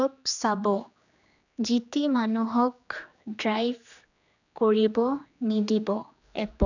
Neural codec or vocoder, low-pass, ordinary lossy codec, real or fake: codec, 16 kHz, 2 kbps, X-Codec, HuBERT features, trained on general audio; 7.2 kHz; none; fake